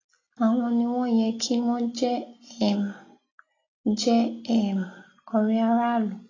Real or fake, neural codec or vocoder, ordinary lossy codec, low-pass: real; none; AAC, 32 kbps; 7.2 kHz